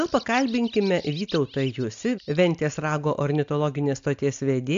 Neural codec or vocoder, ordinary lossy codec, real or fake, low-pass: none; AAC, 64 kbps; real; 7.2 kHz